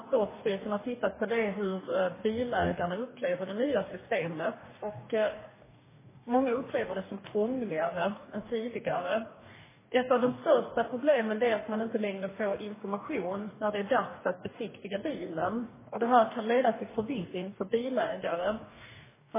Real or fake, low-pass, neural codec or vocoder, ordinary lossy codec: fake; 3.6 kHz; codec, 44.1 kHz, 2.6 kbps, DAC; MP3, 16 kbps